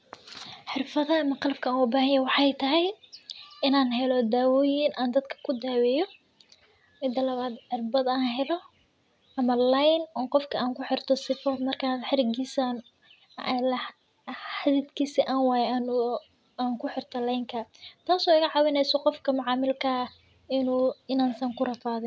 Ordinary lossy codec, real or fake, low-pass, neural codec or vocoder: none; real; none; none